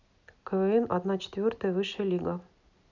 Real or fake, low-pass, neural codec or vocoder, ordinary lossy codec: real; 7.2 kHz; none; none